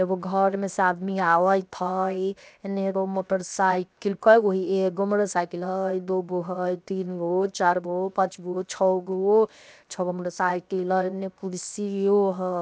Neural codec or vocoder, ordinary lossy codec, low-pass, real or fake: codec, 16 kHz, 0.7 kbps, FocalCodec; none; none; fake